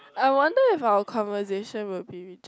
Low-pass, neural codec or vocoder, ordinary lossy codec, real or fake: none; none; none; real